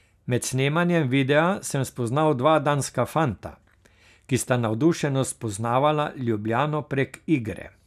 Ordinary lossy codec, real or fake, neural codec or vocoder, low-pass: none; real; none; 14.4 kHz